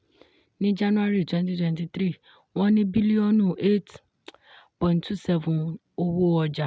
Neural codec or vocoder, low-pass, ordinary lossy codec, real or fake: none; none; none; real